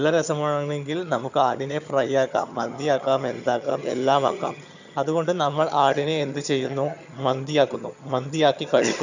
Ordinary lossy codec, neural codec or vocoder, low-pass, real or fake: none; vocoder, 22.05 kHz, 80 mel bands, HiFi-GAN; 7.2 kHz; fake